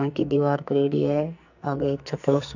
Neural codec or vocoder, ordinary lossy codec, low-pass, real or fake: codec, 44.1 kHz, 2.6 kbps, SNAC; none; 7.2 kHz; fake